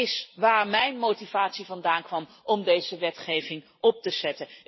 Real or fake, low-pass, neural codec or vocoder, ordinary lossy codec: fake; 7.2 kHz; vocoder, 44.1 kHz, 128 mel bands every 256 samples, BigVGAN v2; MP3, 24 kbps